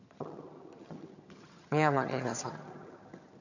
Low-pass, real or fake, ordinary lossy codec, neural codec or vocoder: 7.2 kHz; fake; none; vocoder, 22.05 kHz, 80 mel bands, HiFi-GAN